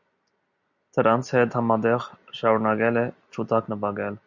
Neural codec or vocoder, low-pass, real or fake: none; 7.2 kHz; real